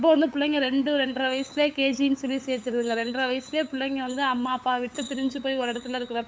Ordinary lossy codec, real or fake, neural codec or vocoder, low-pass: none; fake; codec, 16 kHz, 8 kbps, FunCodec, trained on LibriTTS, 25 frames a second; none